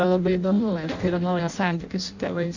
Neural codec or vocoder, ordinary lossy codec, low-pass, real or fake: codec, 16 kHz in and 24 kHz out, 0.6 kbps, FireRedTTS-2 codec; Opus, 64 kbps; 7.2 kHz; fake